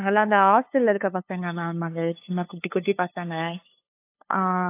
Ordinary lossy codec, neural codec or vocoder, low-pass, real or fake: AAC, 24 kbps; codec, 16 kHz, 2 kbps, FunCodec, trained on LibriTTS, 25 frames a second; 3.6 kHz; fake